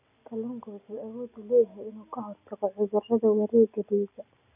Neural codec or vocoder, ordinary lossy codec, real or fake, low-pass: none; none; real; 3.6 kHz